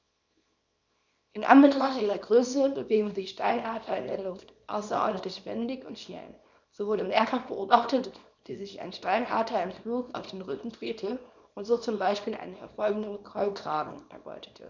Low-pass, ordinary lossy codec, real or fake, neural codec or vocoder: 7.2 kHz; none; fake; codec, 24 kHz, 0.9 kbps, WavTokenizer, small release